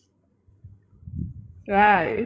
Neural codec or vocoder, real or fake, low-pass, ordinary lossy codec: codec, 16 kHz, 16 kbps, FreqCodec, larger model; fake; none; none